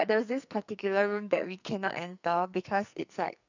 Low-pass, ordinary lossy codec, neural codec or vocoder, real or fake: 7.2 kHz; none; codec, 44.1 kHz, 2.6 kbps, SNAC; fake